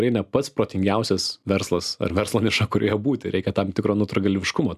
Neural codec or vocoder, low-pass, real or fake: none; 14.4 kHz; real